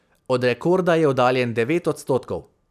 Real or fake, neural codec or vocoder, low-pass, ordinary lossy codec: real; none; 14.4 kHz; none